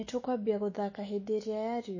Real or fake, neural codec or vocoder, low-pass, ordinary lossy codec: fake; autoencoder, 48 kHz, 128 numbers a frame, DAC-VAE, trained on Japanese speech; 7.2 kHz; MP3, 32 kbps